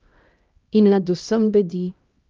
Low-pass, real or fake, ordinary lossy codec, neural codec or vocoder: 7.2 kHz; fake; Opus, 32 kbps; codec, 16 kHz, 1 kbps, X-Codec, HuBERT features, trained on LibriSpeech